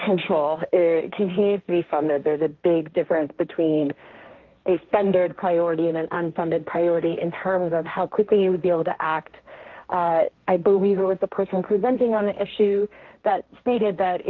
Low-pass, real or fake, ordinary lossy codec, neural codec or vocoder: 7.2 kHz; fake; Opus, 24 kbps; codec, 16 kHz, 1.1 kbps, Voila-Tokenizer